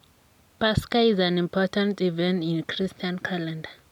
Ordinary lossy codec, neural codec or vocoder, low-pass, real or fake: none; none; 19.8 kHz; real